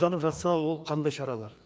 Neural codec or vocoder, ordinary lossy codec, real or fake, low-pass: codec, 16 kHz, 2 kbps, FreqCodec, larger model; none; fake; none